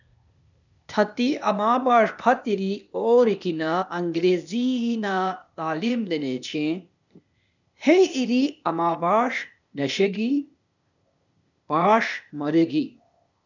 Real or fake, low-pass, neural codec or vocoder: fake; 7.2 kHz; codec, 16 kHz, 0.8 kbps, ZipCodec